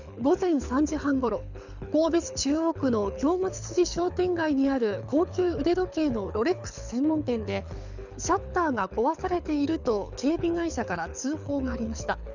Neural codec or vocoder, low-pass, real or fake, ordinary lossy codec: codec, 24 kHz, 6 kbps, HILCodec; 7.2 kHz; fake; none